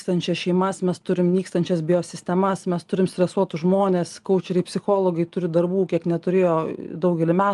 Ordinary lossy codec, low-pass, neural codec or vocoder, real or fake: Opus, 32 kbps; 10.8 kHz; none; real